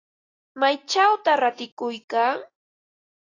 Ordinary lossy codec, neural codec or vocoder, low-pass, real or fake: AAC, 32 kbps; none; 7.2 kHz; real